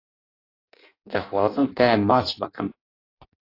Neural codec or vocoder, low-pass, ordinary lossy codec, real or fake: codec, 16 kHz in and 24 kHz out, 0.6 kbps, FireRedTTS-2 codec; 5.4 kHz; AAC, 32 kbps; fake